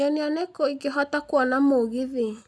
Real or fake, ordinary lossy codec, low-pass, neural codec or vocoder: real; none; none; none